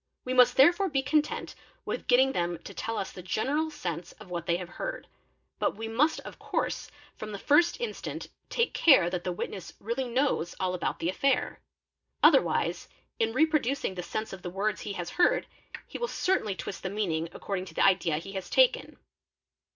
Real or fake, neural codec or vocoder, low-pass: real; none; 7.2 kHz